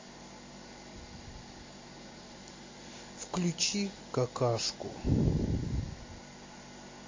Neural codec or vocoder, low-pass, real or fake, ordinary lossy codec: none; 7.2 kHz; real; MP3, 32 kbps